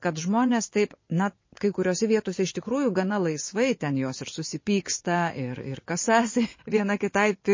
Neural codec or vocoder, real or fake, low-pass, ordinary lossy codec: vocoder, 24 kHz, 100 mel bands, Vocos; fake; 7.2 kHz; MP3, 32 kbps